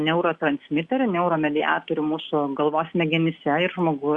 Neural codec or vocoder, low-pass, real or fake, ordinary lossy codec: none; 10.8 kHz; real; Opus, 64 kbps